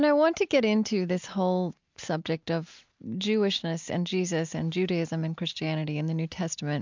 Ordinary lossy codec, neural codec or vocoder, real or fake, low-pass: MP3, 64 kbps; none; real; 7.2 kHz